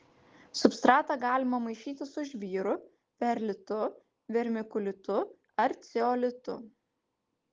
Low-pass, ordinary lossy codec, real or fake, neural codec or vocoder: 7.2 kHz; Opus, 16 kbps; real; none